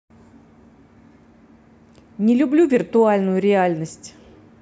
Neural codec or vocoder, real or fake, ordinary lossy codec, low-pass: none; real; none; none